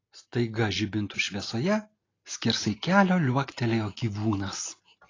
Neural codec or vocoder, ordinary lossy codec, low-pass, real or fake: none; AAC, 32 kbps; 7.2 kHz; real